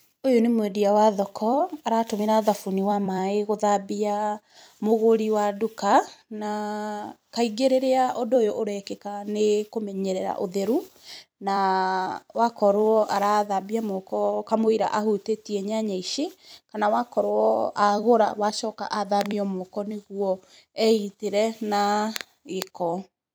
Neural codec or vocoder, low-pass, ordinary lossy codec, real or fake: vocoder, 44.1 kHz, 128 mel bands every 256 samples, BigVGAN v2; none; none; fake